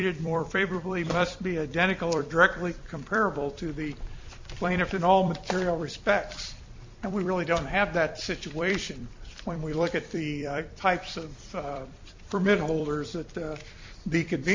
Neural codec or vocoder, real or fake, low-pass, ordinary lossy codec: vocoder, 44.1 kHz, 128 mel bands every 256 samples, BigVGAN v2; fake; 7.2 kHz; MP3, 48 kbps